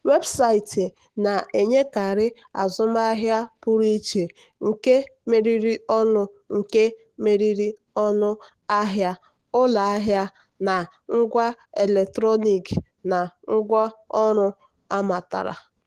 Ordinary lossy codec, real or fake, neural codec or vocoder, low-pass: Opus, 16 kbps; fake; autoencoder, 48 kHz, 128 numbers a frame, DAC-VAE, trained on Japanese speech; 14.4 kHz